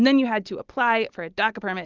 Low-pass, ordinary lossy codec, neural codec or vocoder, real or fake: 7.2 kHz; Opus, 24 kbps; none; real